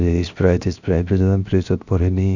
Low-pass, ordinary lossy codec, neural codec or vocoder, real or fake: 7.2 kHz; none; codec, 16 kHz, 0.7 kbps, FocalCodec; fake